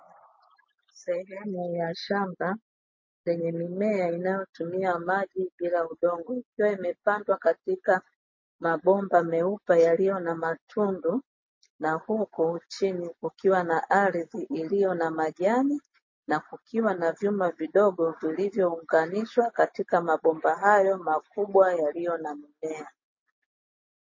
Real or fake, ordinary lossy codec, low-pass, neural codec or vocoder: real; MP3, 32 kbps; 7.2 kHz; none